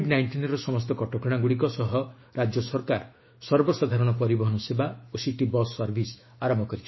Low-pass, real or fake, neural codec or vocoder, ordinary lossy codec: 7.2 kHz; fake; autoencoder, 48 kHz, 128 numbers a frame, DAC-VAE, trained on Japanese speech; MP3, 24 kbps